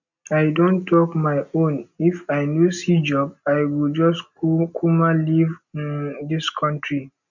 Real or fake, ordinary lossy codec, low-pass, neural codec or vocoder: real; none; 7.2 kHz; none